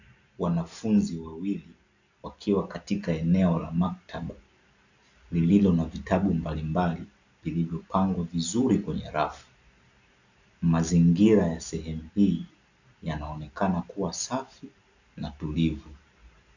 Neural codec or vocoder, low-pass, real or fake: none; 7.2 kHz; real